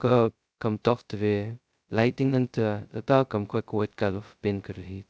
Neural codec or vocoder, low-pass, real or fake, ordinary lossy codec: codec, 16 kHz, 0.2 kbps, FocalCodec; none; fake; none